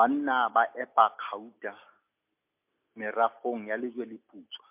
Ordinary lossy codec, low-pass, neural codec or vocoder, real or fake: MP3, 32 kbps; 3.6 kHz; none; real